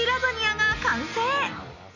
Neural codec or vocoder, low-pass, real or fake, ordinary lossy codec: none; 7.2 kHz; real; none